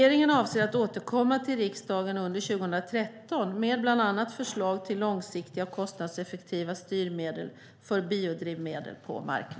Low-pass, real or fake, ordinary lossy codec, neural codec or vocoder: none; real; none; none